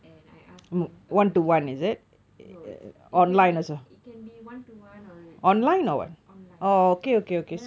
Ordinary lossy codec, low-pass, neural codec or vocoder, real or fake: none; none; none; real